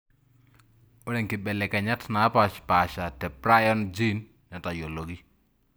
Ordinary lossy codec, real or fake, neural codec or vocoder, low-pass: none; real; none; none